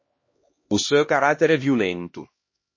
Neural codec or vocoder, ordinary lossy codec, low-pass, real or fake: codec, 16 kHz, 1 kbps, X-Codec, HuBERT features, trained on LibriSpeech; MP3, 32 kbps; 7.2 kHz; fake